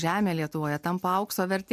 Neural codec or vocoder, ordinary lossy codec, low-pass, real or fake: none; MP3, 96 kbps; 14.4 kHz; real